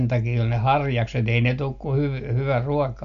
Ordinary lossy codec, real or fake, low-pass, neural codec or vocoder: none; real; 7.2 kHz; none